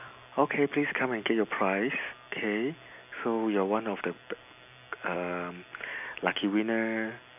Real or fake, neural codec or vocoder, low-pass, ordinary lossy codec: real; none; 3.6 kHz; none